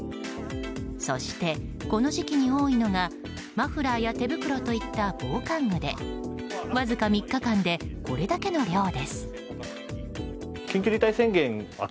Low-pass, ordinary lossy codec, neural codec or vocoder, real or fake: none; none; none; real